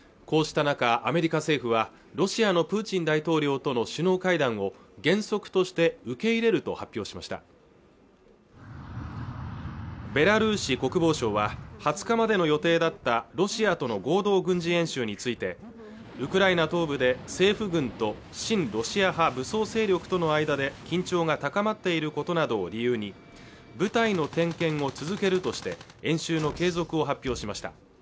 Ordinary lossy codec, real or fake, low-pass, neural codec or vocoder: none; real; none; none